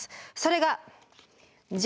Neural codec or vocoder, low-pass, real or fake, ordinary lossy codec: none; none; real; none